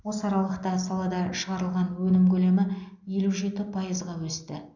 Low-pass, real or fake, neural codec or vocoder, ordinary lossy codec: 7.2 kHz; real; none; none